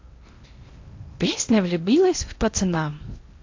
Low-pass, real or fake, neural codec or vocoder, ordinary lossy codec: 7.2 kHz; fake; codec, 16 kHz in and 24 kHz out, 0.8 kbps, FocalCodec, streaming, 65536 codes; AAC, 48 kbps